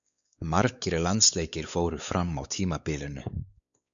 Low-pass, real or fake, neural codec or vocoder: 7.2 kHz; fake; codec, 16 kHz, 4 kbps, X-Codec, WavLM features, trained on Multilingual LibriSpeech